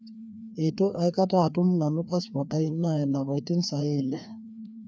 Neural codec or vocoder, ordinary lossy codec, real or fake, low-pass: codec, 16 kHz, 2 kbps, FreqCodec, larger model; none; fake; none